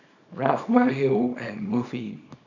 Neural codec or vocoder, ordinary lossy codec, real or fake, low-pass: codec, 24 kHz, 0.9 kbps, WavTokenizer, small release; none; fake; 7.2 kHz